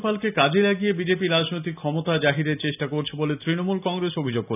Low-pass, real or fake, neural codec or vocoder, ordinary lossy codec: 3.6 kHz; real; none; none